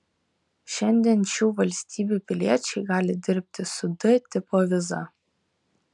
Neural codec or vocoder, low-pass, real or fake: none; 10.8 kHz; real